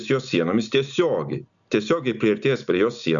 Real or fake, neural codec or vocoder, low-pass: real; none; 7.2 kHz